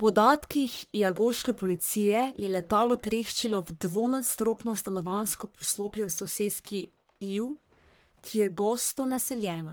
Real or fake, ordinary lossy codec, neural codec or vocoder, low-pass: fake; none; codec, 44.1 kHz, 1.7 kbps, Pupu-Codec; none